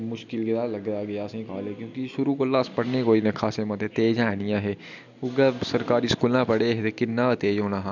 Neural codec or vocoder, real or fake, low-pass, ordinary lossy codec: none; real; 7.2 kHz; none